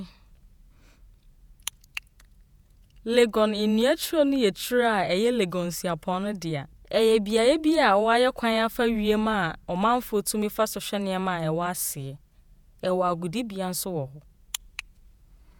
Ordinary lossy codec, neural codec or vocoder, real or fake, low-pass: none; vocoder, 48 kHz, 128 mel bands, Vocos; fake; none